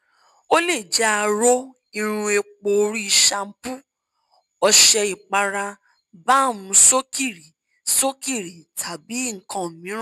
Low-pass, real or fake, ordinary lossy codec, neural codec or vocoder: 14.4 kHz; real; none; none